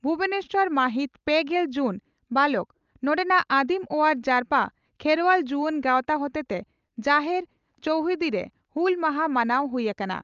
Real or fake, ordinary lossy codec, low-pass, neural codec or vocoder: real; Opus, 24 kbps; 7.2 kHz; none